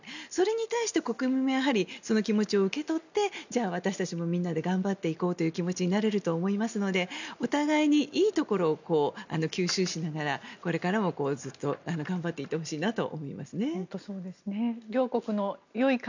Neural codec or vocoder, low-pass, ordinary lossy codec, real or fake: none; 7.2 kHz; none; real